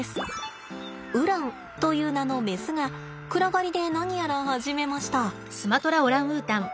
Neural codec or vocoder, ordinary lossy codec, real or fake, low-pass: none; none; real; none